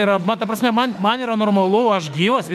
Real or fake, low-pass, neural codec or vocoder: fake; 14.4 kHz; autoencoder, 48 kHz, 32 numbers a frame, DAC-VAE, trained on Japanese speech